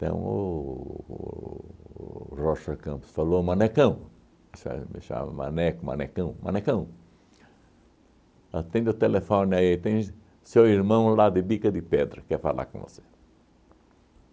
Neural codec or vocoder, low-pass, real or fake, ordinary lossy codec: none; none; real; none